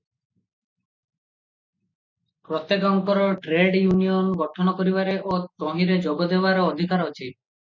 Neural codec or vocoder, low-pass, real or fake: none; 7.2 kHz; real